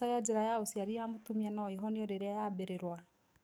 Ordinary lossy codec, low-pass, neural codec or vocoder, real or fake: none; none; codec, 44.1 kHz, 7.8 kbps, DAC; fake